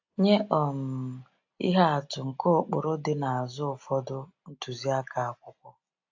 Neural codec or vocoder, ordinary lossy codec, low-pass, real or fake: none; AAC, 48 kbps; 7.2 kHz; real